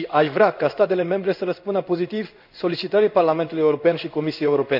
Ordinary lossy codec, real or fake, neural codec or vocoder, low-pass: none; fake; codec, 16 kHz in and 24 kHz out, 1 kbps, XY-Tokenizer; 5.4 kHz